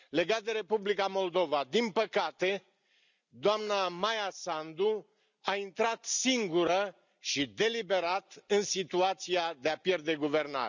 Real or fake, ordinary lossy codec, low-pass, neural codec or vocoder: real; none; 7.2 kHz; none